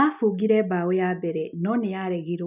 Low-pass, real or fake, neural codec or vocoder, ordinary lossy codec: 3.6 kHz; real; none; none